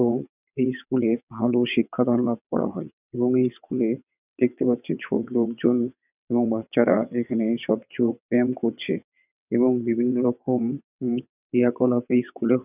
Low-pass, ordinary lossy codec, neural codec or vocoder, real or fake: 3.6 kHz; none; vocoder, 22.05 kHz, 80 mel bands, WaveNeXt; fake